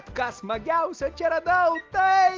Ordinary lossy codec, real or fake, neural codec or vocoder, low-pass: Opus, 24 kbps; real; none; 7.2 kHz